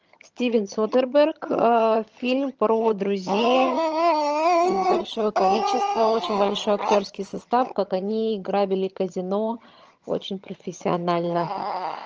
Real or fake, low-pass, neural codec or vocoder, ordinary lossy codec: fake; 7.2 kHz; vocoder, 22.05 kHz, 80 mel bands, HiFi-GAN; Opus, 24 kbps